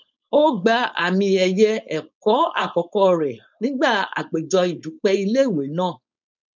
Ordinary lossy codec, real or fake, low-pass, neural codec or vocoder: none; fake; 7.2 kHz; codec, 16 kHz, 4.8 kbps, FACodec